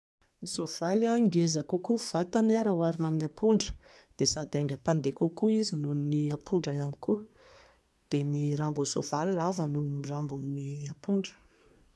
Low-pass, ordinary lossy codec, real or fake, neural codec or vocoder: none; none; fake; codec, 24 kHz, 1 kbps, SNAC